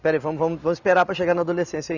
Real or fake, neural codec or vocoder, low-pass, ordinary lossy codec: real; none; 7.2 kHz; none